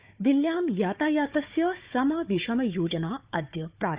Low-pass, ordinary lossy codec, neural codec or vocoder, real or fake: 3.6 kHz; Opus, 64 kbps; codec, 16 kHz, 4 kbps, FunCodec, trained on Chinese and English, 50 frames a second; fake